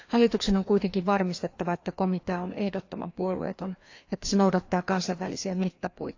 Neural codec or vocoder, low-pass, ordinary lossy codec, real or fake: codec, 16 kHz, 2 kbps, FreqCodec, larger model; 7.2 kHz; AAC, 48 kbps; fake